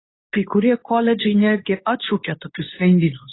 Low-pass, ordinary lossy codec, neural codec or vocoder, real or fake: 7.2 kHz; AAC, 16 kbps; codec, 24 kHz, 0.9 kbps, WavTokenizer, medium speech release version 2; fake